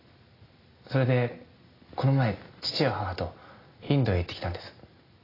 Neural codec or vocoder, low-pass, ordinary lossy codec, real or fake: none; 5.4 kHz; AAC, 24 kbps; real